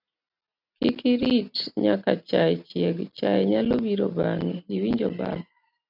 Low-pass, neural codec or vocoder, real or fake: 5.4 kHz; none; real